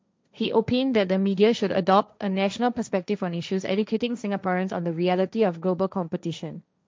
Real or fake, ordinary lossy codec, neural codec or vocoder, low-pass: fake; none; codec, 16 kHz, 1.1 kbps, Voila-Tokenizer; none